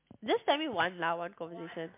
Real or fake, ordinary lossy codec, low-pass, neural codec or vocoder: real; MP3, 32 kbps; 3.6 kHz; none